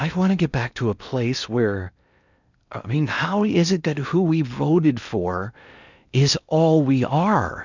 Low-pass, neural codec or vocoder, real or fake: 7.2 kHz; codec, 16 kHz in and 24 kHz out, 0.8 kbps, FocalCodec, streaming, 65536 codes; fake